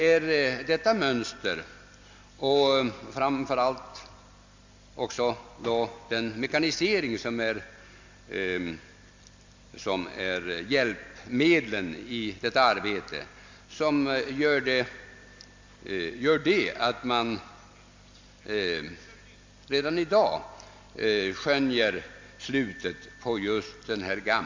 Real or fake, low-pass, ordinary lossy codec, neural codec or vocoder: real; 7.2 kHz; MP3, 64 kbps; none